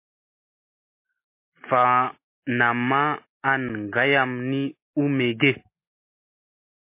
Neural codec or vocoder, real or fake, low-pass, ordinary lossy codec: none; real; 3.6 kHz; MP3, 24 kbps